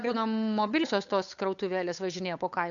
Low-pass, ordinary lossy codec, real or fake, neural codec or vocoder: 7.2 kHz; MP3, 96 kbps; fake; codec, 16 kHz, 8 kbps, FunCodec, trained on Chinese and English, 25 frames a second